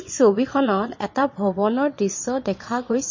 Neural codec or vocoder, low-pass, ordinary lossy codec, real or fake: vocoder, 22.05 kHz, 80 mel bands, Vocos; 7.2 kHz; MP3, 32 kbps; fake